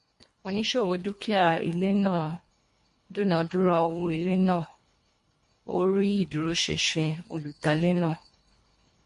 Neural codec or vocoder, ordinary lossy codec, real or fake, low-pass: codec, 24 kHz, 1.5 kbps, HILCodec; MP3, 48 kbps; fake; 10.8 kHz